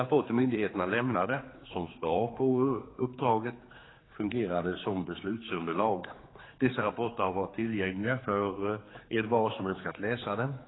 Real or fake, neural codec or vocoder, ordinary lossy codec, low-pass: fake; codec, 16 kHz, 4 kbps, X-Codec, HuBERT features, trained on general audio; AAC, 16 kbps; 7.2 kHz